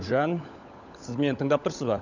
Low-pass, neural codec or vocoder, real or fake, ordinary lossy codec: 7.2 kHz; codec, 16 kHz, 16 kbps, FunCodec, trained on LibriTTS, 50 frames a second; fake; none